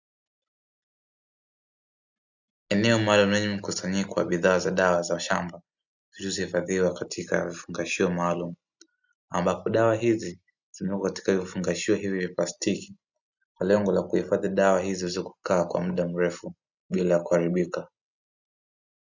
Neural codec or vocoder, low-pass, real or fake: none; 7.2 kHz; real